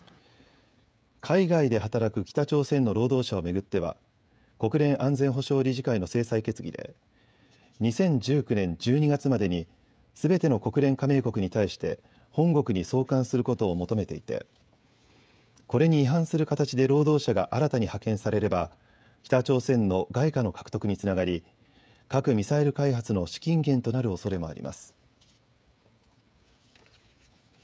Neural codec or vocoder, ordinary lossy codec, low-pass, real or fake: codec, 16 kHz, 16 kbps, FreqCodec, smaller model; none; none; fake